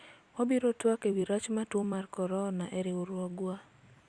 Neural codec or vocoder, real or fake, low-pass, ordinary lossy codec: vocoder, 44.1 kHz, 128 mel bands every 256 samples, BigVGAN v2; fake; 9.9 kHz; Opus, 64 kbps